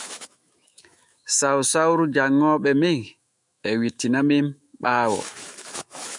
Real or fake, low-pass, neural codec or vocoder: fake; 10.8 kHz; autoencoder, 48 kHz, 128 numbers a frame, DAC-VAE, trained on Japanese speech